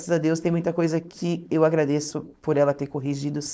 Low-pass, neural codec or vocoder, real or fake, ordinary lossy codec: none; codec, 16 kHz, 4.8 kbps, FACodec; fake; none